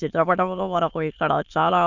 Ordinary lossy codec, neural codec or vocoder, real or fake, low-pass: none; autoencoder, 22.05 kHz, a latent of 192 numbers a frame, VITS, trained on many speakers; fake; 7.2 kHz